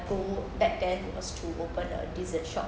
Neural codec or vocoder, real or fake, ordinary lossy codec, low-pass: none; real; none; none